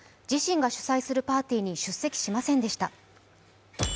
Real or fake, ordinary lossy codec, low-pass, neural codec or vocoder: real; none; none; none